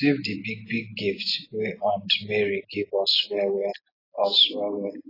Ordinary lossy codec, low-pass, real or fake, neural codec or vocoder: AAC, 24 kbps; 5.4 kHz; real; none